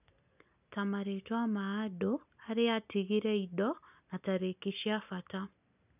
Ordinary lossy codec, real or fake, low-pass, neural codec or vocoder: none; real; 3.6 kHz; none